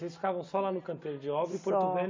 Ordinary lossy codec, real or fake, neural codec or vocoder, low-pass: MP3, 48 kbps; real; none; 7.2 kHz